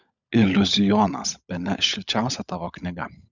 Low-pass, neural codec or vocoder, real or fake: 7.2 kHz; codec, 16 kHz, 16 kbps, FunCodec, trained on LibriTTS, 50 frames a second; fake